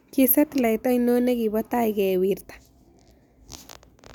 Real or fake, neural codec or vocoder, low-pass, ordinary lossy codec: real; none; none; none